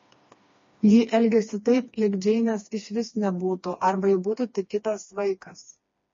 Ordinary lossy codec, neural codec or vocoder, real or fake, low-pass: MP3, 32 kbps; codec, 16 kHz, 2 kbps, FreqCodec, smaller model; fake; 7.2 kHz